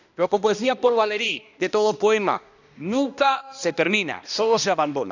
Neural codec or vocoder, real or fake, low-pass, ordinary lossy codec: codec, 16 kHz, 1 kbps, X-Codec, HuBERT features, trained on balanced general audio; fake; 7.2 kHz; none